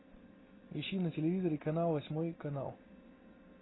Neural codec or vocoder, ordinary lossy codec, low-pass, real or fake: none; AAC, 16 kbps; 7.2 kHz; real